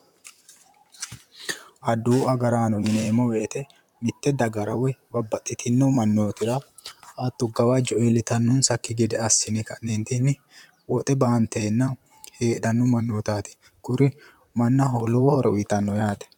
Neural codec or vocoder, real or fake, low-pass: vocoder, 44.1 kHz, 128 mel bands, Pupu-Vocoder; fake; 19.8 kHz